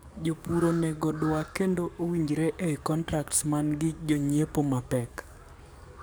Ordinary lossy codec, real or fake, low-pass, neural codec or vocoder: none; fake; none; codec, 44.1 kHz, 7.8 kbps, DAC